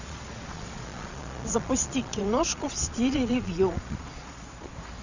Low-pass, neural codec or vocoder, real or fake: 7.2 kHz; vocoder, 22.05 kHz, 80 mel bands, Vocos; fake